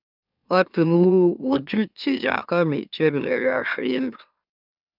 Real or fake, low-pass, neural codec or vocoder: fake; 5.4 kHz; autoencoder, 44.1 kHz, a latent of 192 numbers a frame, MeloTTS